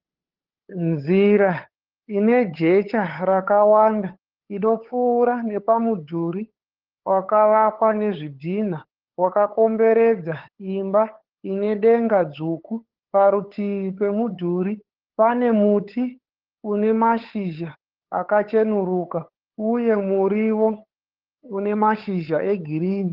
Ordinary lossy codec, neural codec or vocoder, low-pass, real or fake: Opus, 16 kbps; codec, 16 kHz, 8 kbps, FunCodec, trained on LibriTTS, 25 frames a second; 5.4 kHz; fake